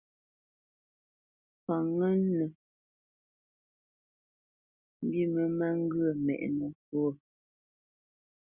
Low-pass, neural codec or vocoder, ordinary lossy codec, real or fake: 3.6 kHz; none; Opus, 64 kbps; real